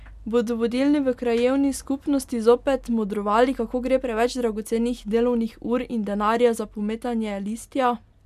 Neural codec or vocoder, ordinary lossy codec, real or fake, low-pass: none; none; real; 14.4 kHz